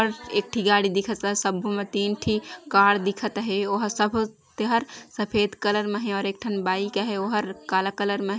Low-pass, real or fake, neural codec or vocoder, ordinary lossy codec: none; real; none; none